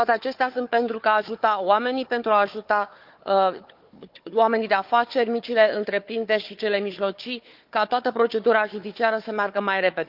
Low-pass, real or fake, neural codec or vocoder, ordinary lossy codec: 5.4 kHz; fake; codec, 16 kHz, 4 kbps, FunCodec, trained on Chinese and English, 50 frames a second; Opus, 24 kbps